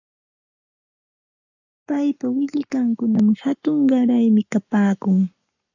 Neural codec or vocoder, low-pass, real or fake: codec, 44.1 kHz, 7.8 kbps, Pupu-Codec; 7.2 kHz; fake